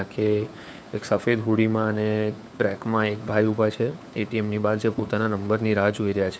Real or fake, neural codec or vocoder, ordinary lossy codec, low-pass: fake; codec, 16 kHz, 4 kbps, FunCodec, trained on LibriTTS, 50 frames a second; none; none